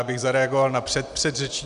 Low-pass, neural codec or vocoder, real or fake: 10.8 kHz; none; real